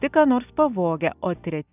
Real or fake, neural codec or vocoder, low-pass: real; none; 3.6 kHz